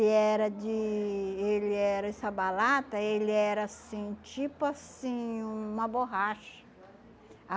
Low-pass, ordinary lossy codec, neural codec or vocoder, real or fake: none; none; none; real